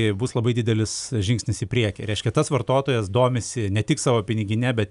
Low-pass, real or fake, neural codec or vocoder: 10.8 kHz; real; none